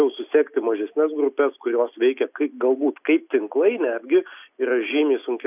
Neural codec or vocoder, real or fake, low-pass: none; real; 3.6 kHz